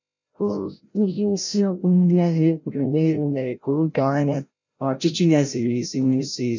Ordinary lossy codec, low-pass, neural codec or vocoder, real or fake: none; 7.2 kHz; codec, 16 kHz, 0.5 kbps, FreqCodec, larger model; fake